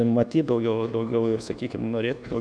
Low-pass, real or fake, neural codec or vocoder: 9.9 kHz; fake; codec, 24 kHz, 1.2 kbps, DualCodec